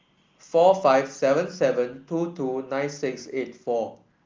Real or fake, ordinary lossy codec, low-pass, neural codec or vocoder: real; Opus, 32 kbps; 7.2 kHz; none